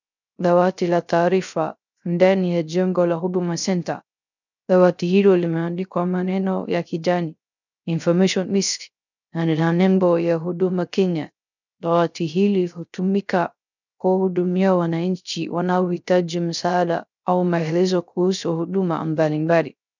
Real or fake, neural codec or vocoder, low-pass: fake; codec, 16 kHz, 0.3 kbps, FocalCodec; 7.2 kHz